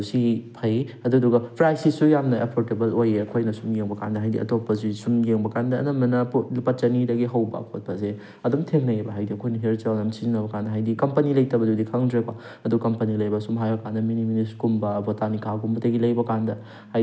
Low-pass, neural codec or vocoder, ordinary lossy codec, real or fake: none; none; none; real